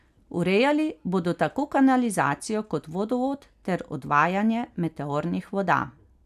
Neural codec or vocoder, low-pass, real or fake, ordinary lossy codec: none; 14.4 kHz; real; none